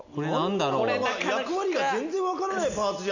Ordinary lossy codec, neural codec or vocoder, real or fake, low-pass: none; none; real; 7.2 kHz